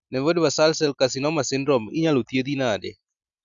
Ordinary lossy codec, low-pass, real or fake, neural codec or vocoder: none; 7.2 kHz; real; none